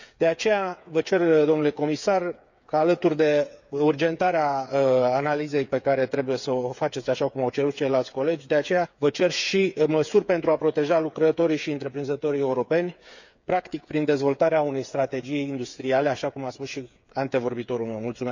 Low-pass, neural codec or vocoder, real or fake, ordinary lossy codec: 7.2 kHz; codec, 16 kHz, 8 kbps, FreqCodec, smaller model; fake; none